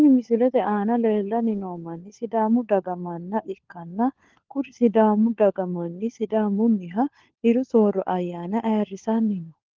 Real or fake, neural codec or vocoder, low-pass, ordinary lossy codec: fake; codec, 16 kHz, 4 kbps, FunCodec, trained on LibriTTS, 50 frames a second; 7.2 kHz; Opus, 16 kbps